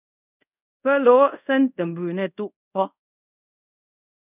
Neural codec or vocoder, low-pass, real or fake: codec, 24 kHz, 0.5 kbps, DualCodec; 3.6 kHz; fake